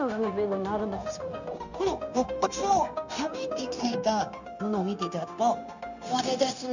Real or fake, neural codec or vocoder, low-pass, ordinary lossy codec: fake; codec, 16 kHz, 0.9 kbps, LongCat-Audio-Codec; 7.2 kHz; none